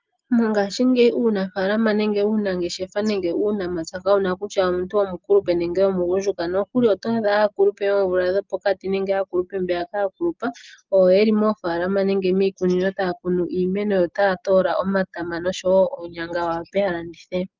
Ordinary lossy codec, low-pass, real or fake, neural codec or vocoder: Opus, 24 kbps; 7.2 kHz; real; none